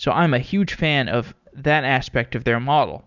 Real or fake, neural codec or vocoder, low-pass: real; none; 7.2 kHz